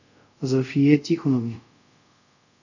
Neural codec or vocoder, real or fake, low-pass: codec, 24 kHz, 0.5 kbps, DualCodec; fake; 7.2 kHz